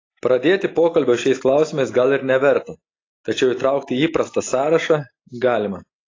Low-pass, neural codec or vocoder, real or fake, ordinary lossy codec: 7.2 kHz; none; real; AAC, 32 kbps